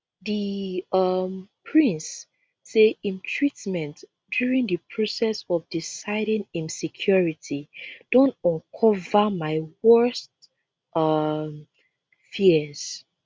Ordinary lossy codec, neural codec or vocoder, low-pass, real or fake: none; none; none; real